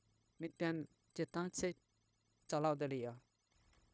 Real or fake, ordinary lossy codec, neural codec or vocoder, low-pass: fake; none; codec, 16 kHz, 0.9 kbps, LongCat-Audio-Codec; none